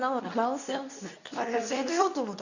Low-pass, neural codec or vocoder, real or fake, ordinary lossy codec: 7.2 kHz; codec, 24 kHz, 0.9 kbps, WavTokenizer, medium speech release version 2; fake; none